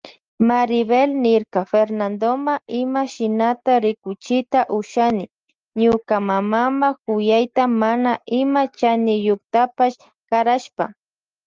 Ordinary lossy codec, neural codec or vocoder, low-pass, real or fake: Opus, 32 kbps; none; 7.2 kHz; real